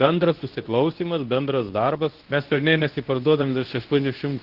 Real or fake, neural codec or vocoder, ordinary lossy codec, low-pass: fake; codec, 24 kHz, 0.9 kbps, WavTokenizer, medium speech release version 2; Opus, 16 kbps; 5.4 kHz